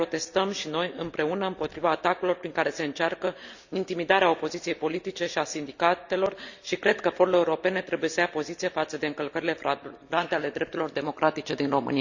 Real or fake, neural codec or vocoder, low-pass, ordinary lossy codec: real; none; 7.2 kHz; Opus, 64 kbps